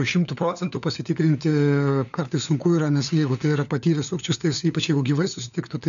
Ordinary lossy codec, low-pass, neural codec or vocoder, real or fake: MP3, 96 kbps; 7.2 kHz; codec, 16 kHz, 4 kbps, FunCodec, trained on LibriTTS, 50 frames a second; fake